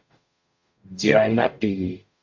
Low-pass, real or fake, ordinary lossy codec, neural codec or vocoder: 7.2 kHz; fake; MP3, 48 kbps; codec, 44.1 kHz, 0.9 kbps, DAC